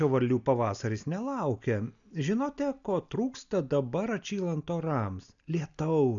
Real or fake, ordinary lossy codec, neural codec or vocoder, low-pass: real; MP3, 96 kbps; none; 7.2 kHz